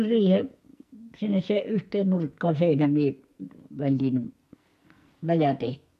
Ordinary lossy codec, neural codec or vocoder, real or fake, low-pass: MP3, 64 kbps; codec, 44.1 kHz, 2.6 kbps, SNAC; fake; 14.4 kHz